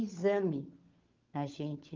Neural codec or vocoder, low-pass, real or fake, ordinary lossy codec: codec, 16 kHz, 16 kbps, FunCodec, trained on LibriTTS, 50 frames a second; 7.2 kHz; fake; Opus, 24 kbps